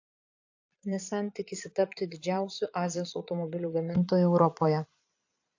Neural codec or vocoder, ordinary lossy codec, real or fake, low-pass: vocoder, 22.05 kHz, 80 mel bands, Vocos; AAC, 48 kbps; fake; 7.2 kHz